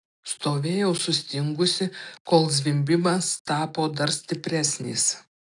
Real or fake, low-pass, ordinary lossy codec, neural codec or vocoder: real; 10.8 kHz; AAC, 64 kbps; none